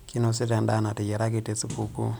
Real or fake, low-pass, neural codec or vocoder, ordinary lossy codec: real; none; none; none